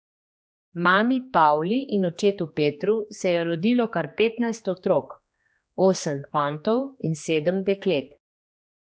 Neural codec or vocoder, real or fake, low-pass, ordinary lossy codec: codec, 16 kHz, 2 kbps, X-Codec, HuBERT features, trained on general audio; fake; none; none